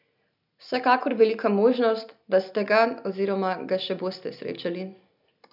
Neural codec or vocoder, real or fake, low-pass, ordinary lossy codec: none; real; 5.4 kHz; none